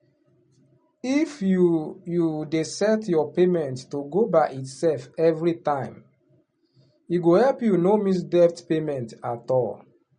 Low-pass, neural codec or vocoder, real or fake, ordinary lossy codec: 9.9 kHz; none; real; MP3, 48 kbps